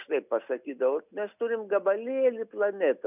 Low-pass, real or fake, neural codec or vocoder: 3.6 kHz; real; none